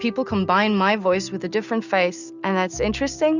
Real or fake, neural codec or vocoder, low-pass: real; none; 7.2 kHz